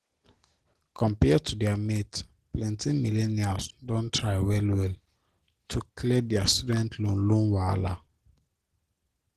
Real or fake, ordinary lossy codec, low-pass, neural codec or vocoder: real; Opus, 16 kbps; 14.4 kHz; none